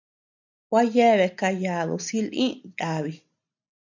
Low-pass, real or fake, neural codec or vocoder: 7.2 kHz; real; none